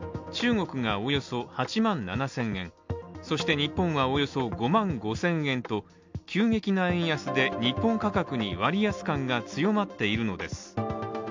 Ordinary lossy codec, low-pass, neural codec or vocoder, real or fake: MP3, 64 kbps; 7.2 kHz; none; real